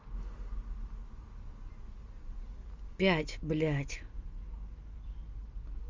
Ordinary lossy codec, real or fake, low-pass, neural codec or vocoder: Opus, 32 kbps; real; 7.2 kHz; none